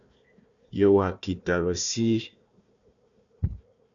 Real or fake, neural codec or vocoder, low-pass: fake; codec, 16 kHz, 1 kbps, FunCodec, trained on Chinese and English, 50 frames a second; 7.2 kHz